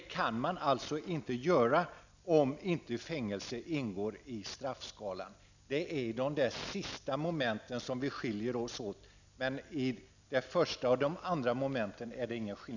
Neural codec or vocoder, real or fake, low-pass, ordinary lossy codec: none; real; 7.2 kHz; none